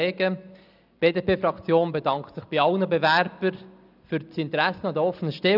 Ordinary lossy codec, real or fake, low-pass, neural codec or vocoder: none; real; 5.4 kHz; none